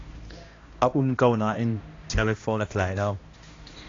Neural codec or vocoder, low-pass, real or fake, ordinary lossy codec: codec, 16 kHz, 1 kbps, X-Codec, HuBERT features, trained on balanced general audio; 7.2 kHz; fake; AAC, 32 kbps